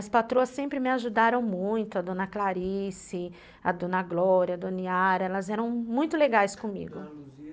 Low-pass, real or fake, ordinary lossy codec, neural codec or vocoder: none; real; none; none